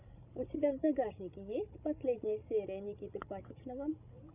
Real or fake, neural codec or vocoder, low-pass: fake; codec, 16 kHz, 16 kbps, FreqCodec, larger model; 3.6 kHz